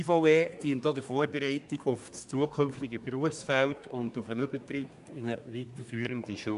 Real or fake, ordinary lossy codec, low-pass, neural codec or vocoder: fake; none; 10.8 kHz; codec, 24 kHz, 1 kbps, SNAC